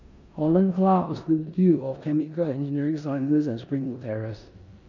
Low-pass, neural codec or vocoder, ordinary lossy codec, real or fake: 7.2 kHz; codec, 16 kHz in and 24 kHz out, 0.9 kbps, LongCat-Audio-Codec, four codebook decoder; none; fake